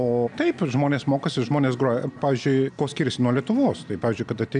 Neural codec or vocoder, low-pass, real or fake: none; 9.9 kHz; real